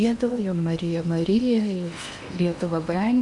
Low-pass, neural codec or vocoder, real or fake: 10.8 kHz; codec, 16 kHz in and 24 kHz out, 0.8 kbps, FocalCodec, streaming, 65536 codes; fake